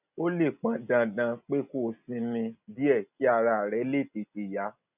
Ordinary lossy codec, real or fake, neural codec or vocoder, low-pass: none; real; none; 3.6 kHz